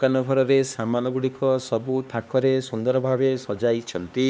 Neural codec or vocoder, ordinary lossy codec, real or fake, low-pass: codec, 16 kHz, 2 kbps, X-Codec, HuBERT features, trained on LibriSpeech; none; fake; none